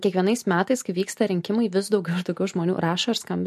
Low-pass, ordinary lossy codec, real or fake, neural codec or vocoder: 14.4 kHz; MP3, 64 kbps; real; none